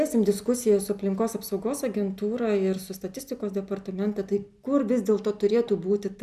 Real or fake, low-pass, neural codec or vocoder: real; 14.4 kHz; none